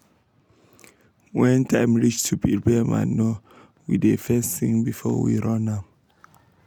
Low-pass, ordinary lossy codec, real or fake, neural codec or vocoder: 19.8 kHz; none; real; none